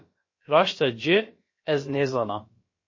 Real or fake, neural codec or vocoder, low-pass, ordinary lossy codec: fake; codec, 16 kHz, about 1 kbps, DyCAST, with the encoder's durations; 7.2 kHz; MP3, 32 kbps